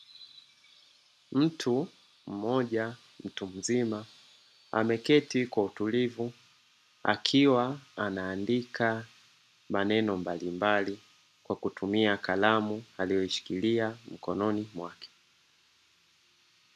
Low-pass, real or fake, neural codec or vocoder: 14.4 kHz; real; none